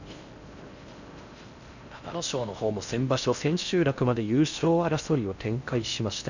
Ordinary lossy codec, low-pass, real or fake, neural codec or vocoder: none; 7.2 kHz; fake; codec, 16 kHz in and 24 kHz out, 0.6 kbps, FocalCodec, streaming, 4096 codes